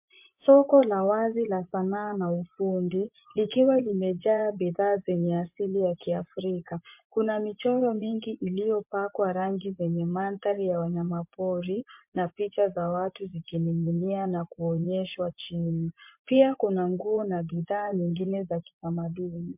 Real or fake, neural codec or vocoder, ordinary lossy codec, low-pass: fake; vocoder, 24 kHz, 100 mel bands, Vocos; MP3, 32 kbps; 3.6 kHz